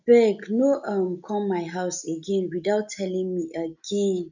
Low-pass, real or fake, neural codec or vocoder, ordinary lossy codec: 7.2 kHz; real; none; none